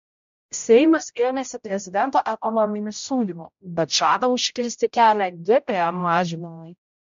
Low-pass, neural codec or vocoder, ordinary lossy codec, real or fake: 7.2 kHz; codec, 16 kHz, 0.5 kbps, X-Codec, HuBERT features, trained on general audio; MP3, 48 kbps; fake